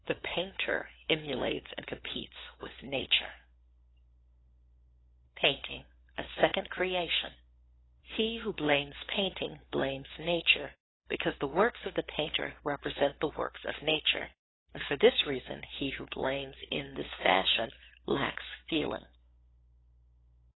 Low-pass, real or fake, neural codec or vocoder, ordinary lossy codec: 7.2 kHz; fake; codec, 16 kHz, 4 kbps, FunCodec, trained on LibriTTS, 50 frames a second; AAC, 16 kbps